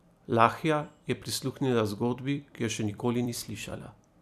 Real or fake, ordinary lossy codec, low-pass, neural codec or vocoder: fake; none; 14.4 kHz; vocoder, 44.1 kHz, 128 mel bands every 256 samples, BigVGAN v2